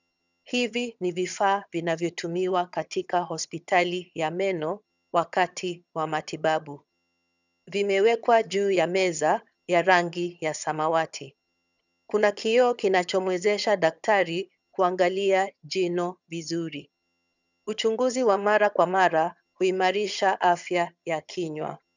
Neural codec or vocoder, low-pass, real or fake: vocoder, 22.05 kHz, 80 mel bands, HiFi-GAN; 7.2 kHz; fake